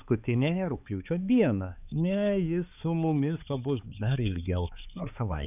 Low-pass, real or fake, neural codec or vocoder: 3.6 kHz; fake; codec, 16 kHz, 4 kbps, X-Codec, HuBERT features, trained on balanced general audio